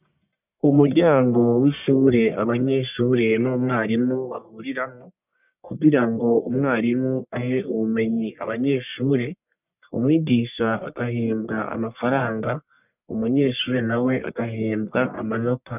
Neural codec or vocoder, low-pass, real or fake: codec, 44.1 kHz, 1.7 kbps, Pupu-Codec; 3.6 kHz; fake